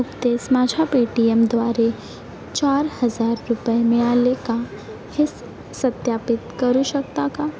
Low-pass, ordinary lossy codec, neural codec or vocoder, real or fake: none; none; none; real